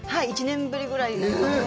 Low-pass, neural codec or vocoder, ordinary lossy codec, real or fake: none; none; none; real